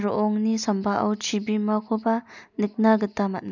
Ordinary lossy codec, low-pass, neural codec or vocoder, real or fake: none; 7.2 kHz; none; real